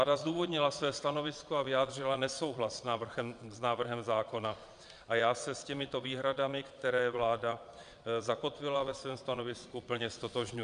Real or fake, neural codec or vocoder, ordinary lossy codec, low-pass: fake; vocoder, 22.05 kHz, 80 mel bands, Vocos; AAC, 96 kbps; 9.9 kHz